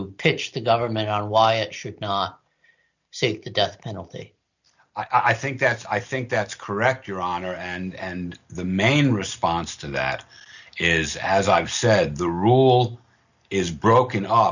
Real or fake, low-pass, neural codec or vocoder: real; 7.2 kHz; none